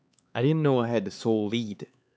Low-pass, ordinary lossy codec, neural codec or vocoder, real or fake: none; none; codec, 16 kHz, 4 kbps, X-Codec, HuBERT features, trained on LibriSpeech; fake